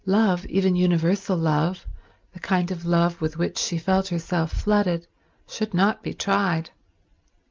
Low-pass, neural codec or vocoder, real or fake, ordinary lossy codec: 7.2 kHz; none; real; Opus, 24 kbps